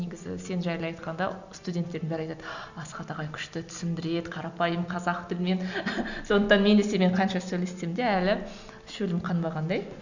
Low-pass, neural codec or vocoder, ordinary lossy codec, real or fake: 7.2 kHz; none; none; real